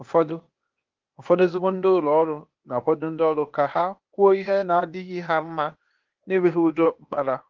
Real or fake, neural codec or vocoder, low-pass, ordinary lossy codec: fake; codec, 16 kHz, 0.8 kbps, ZipCodec; 7.2 kHz; Opus, 32 kbps